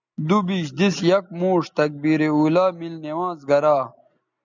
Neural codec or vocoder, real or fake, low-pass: none; real; 7.2 kHz